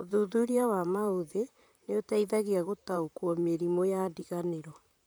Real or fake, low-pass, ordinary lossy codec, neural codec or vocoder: fake; none; none; vocoder, 44.1 kHz, 128 mel bands, Pupu-Vocoder